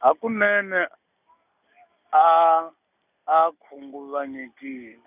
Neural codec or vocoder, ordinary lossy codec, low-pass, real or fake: none; none; 3.6 kHz; real